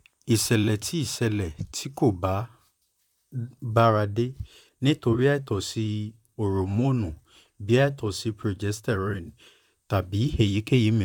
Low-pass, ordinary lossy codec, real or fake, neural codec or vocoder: 19.8 kHz; none; fake; vocoder, 44.1 kHz, 128 mel bands, Pupu-Vocoder